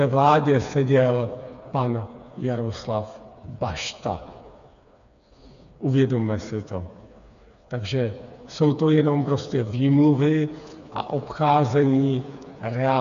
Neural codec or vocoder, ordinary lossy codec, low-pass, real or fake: codec, 16 kHz, 4 kbps, FreqCodec, smaller model; AAC, 96 kbps; 7.2 kHz; fake